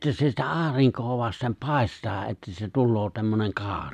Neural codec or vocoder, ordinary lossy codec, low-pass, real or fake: none; none; 14.4 kHz; real